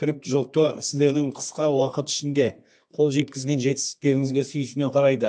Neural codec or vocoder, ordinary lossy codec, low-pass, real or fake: codec, 24 kHz, 0.9 kbps, WavTokenizer, medium music audio release; AAC, 64 kbps; 9.9 kHz; fake